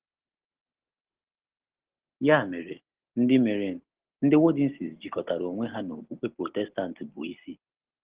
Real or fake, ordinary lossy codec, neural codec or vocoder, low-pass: real; Opus, 16 kbps; none; 3.6 kHz